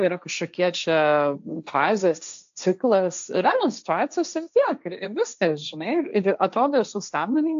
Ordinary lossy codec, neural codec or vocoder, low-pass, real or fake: AAC, 64 kbps; codec, 16 kHz, 1.1 kbps, Voila-Tokenizer; 7.2 kHz; fake